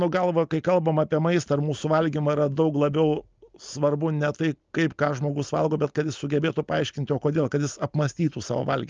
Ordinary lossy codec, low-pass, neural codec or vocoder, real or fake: Opus, 24 kbps; 7.2 kHz; none; real